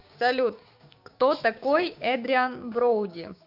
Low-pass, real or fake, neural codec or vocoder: 5.4 kHz; fake; codec, 16 kHz, 6 kbps, DAC